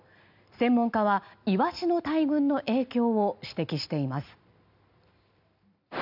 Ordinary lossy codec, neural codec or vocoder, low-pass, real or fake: none; none; 5.4 kHz; real